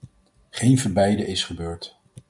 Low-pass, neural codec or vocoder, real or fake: 10.8 kHz; none; real